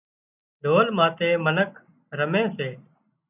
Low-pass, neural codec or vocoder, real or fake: 3.6 kHz; none; real